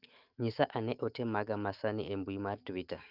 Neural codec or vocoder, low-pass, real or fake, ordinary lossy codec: autoencoder, 48 kHz, 128 numbers a frame, DAC-VAE, trained on Japanese speech; 5.4 kHz; fake; Opus, 24 kbps